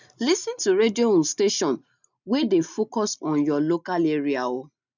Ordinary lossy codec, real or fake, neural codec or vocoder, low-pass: none; real; none; 7.2 kHz